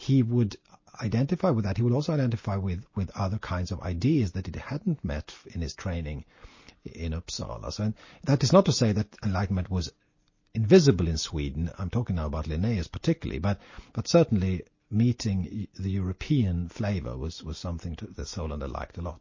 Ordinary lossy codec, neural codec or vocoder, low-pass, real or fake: MP3, 32 kbps; none; 7.2 kHz; real